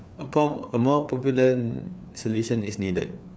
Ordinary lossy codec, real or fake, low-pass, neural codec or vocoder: none; fake; none; codec, 16 kHz, 4 kbps, FreqCodec, larger model